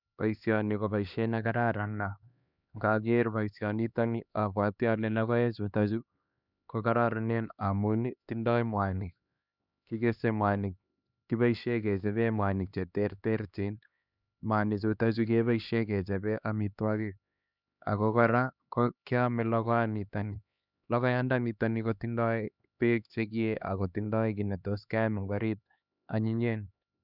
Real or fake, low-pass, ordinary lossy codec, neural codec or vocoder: fake; 5.4 kHz; none; codec, 16 kHz, 2 kbps, X-Codec, HuBERT features, trained on LibriSpeech